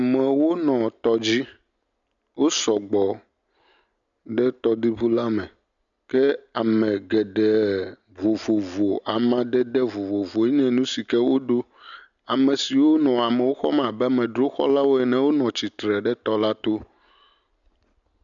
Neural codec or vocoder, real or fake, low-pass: none; real; 7.2 kHz